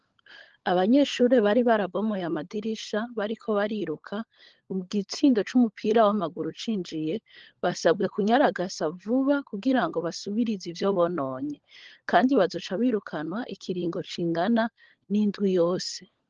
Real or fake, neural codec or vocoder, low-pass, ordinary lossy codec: fake; codec, 16 kHz, 16 kbps, FunCodec, trained on LibriTTS, 50 frames a second; 7.2 kHz; Opus, 16 kbps